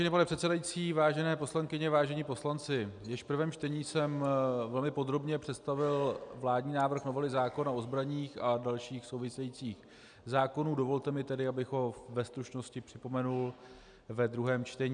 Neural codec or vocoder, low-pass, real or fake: none; 9.9 kHz; real